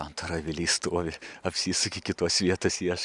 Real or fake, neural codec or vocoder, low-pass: fake; vocoder, 44.1 kHz, 128 mel bands every 512 samples, BigVGAN v2; 10.8 kHz